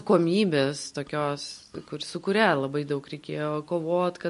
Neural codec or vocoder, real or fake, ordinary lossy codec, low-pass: none; real; MP3, 48 kbps; 14.4 kHz